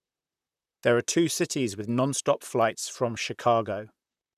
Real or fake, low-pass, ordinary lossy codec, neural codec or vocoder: fake; 14.4 kHz; none; vocoder, 44.1 kHz, 128 mel bands, Pupu-Vocoder